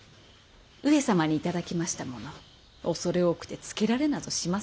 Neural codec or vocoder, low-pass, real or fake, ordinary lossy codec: none; none; real; none